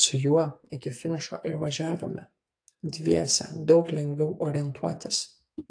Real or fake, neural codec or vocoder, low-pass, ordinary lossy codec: fake; codec, 44.1 kHz, 2.6 kbps, SNAC; 9.9 kHz; AAC, 48 kbps